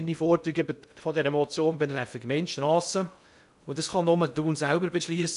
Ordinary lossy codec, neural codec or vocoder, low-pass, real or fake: none; codec, 16 kHz in and 24 kHz out, 0.6 kbps, FocalCodec, streaming, 2048 codes; 10.8 kHz; fake